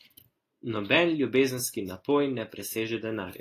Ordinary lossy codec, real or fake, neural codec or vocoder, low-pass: AAC, 48 kbps; real; none; 14.4 kHz